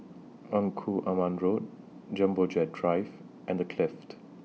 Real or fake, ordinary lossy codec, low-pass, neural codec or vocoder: real; none; none; none